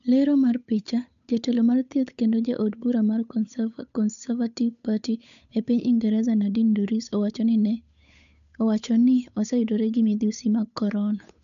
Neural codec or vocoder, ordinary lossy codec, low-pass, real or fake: codec, 16 kHz, 8 kbps, FunCodec, trained on Chinese and English, 25 frames a second; none; 7.2 kHz; fake